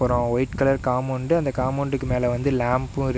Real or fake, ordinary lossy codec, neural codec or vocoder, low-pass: real; none; none; none